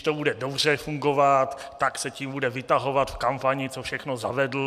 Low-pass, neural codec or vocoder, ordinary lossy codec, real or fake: 14.4 kHz; none; MP3, 96 kbps; real